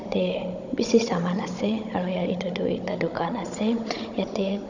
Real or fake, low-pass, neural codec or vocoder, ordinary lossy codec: fake; 7.2 kHz; codec, 16 kHz, 16 kbps, FunCodec, trained on Chinese and English, 50 frames a second; none